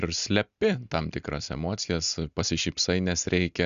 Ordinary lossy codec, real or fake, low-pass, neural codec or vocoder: Opus, 64 kbps; real; 7.2 kHz; none